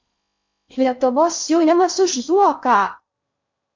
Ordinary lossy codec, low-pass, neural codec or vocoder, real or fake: MP3, 48 kbps; 7.2 kHz; codec, 16 kHz in and 24 kHz out, 0.6 kbps, FocalCodec, streaming, 4096 codes; fake